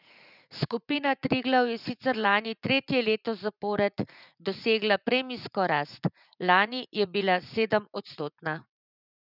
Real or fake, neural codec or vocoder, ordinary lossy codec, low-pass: fake; vocoder, 44.1 kHz, 80 mel bands, Vocos; none; 5.4 kHz